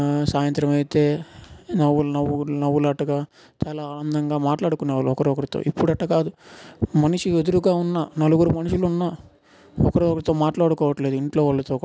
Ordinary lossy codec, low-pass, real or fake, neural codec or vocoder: none; none; real; none